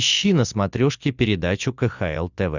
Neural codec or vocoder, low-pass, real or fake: none; 7.2 kHz; real